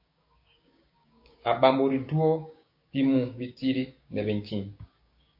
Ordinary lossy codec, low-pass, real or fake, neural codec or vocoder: MP3, 32 kbps; 5.4 kHz; fake; codec, 16 kHz, 6 kbps, DAC